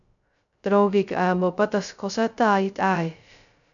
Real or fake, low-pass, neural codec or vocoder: fake; 7.2 kHz; codec, 16 kHz, 0.2 kbps, FocalCodec